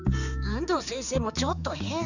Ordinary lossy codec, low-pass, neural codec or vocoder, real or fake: none; 7.2 kHz; codec, 16 kHz, 4 kbps, X-Codec, HuBERT features, trained on general audio; fake